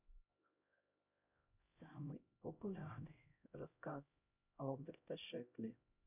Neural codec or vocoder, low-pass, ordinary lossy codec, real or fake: codec, 16 kHz, 0.5 kbps, X-Codec, HuBERT features, trained on LibriSpeech; 3.6 kHz; none; fake